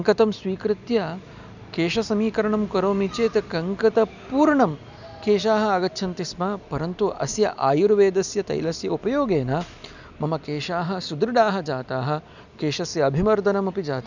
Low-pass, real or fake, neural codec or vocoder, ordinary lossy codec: 7.2 kHz; real; none; none